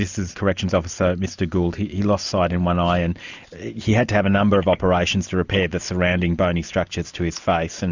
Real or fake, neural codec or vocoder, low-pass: real; none; 7.2 kHz